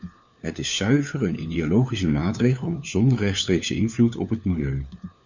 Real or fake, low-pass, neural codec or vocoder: fake; 7.2 kHz; codec, 16 kHz, 4 kbps, FunCodec, trained on LibriTTS, 50 frames a second